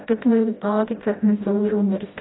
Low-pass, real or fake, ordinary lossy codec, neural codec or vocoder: 7.2 kHz; fake; AAC, 16 kbps; codec, 16 kHz, 0.5 kbps, FreqCodec, smaller model